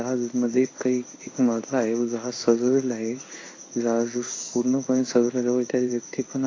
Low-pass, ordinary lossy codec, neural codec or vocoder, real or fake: 7.2 kHz; AAC, 32 kbps; codec, 16 kHz in and 24 kHz out, 1 kbps, XY-Tokenizer; fake